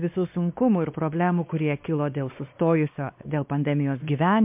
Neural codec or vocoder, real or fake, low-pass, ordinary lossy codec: codec, 16 kHz, 2 kbps, X-Codec, WavLM features, trained on Multilingual LibriSpeech; fake; 3.6 kHz; MP3, 32 kbps